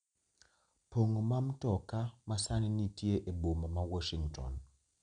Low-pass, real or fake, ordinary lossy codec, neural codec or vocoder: 9.9 kHz; real; none; none